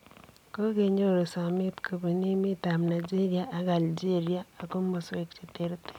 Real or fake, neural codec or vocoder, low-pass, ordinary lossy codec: real; none; 19.8 kHz; none